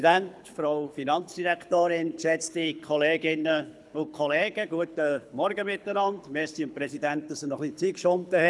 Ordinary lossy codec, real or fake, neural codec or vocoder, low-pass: none; fake; codec, 24 kHz, 6 kbps, HILCodec; none